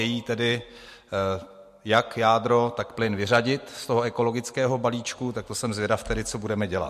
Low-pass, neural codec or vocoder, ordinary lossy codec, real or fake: 14.4 kHz; none; MP3, 64 kbps; real